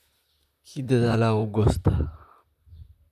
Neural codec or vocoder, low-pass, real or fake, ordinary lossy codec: vocoder, 44.1 kHz, 128 mel bands, Pupu-Vocoder; 14.4 kHz; fake; none